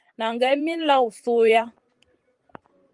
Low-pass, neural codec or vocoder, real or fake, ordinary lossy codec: 10.8 kHz; vocoder, 44.1 kHz, 128 mel bands every 512 samples, BigVGAN v2; fake; Opus, 32 kbps